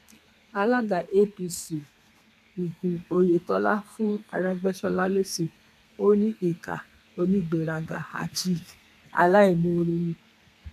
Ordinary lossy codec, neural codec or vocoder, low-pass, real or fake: none; codec, 32 kHz, 1.9 kbps, SNAC; 14.4 kHz; fake